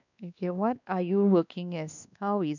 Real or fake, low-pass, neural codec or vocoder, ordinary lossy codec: fake; 7.2 kHz; codec, 16 kHz, 2 kbps, X-Codec, WavLM features, trained on Multilingual LibriSpeech; none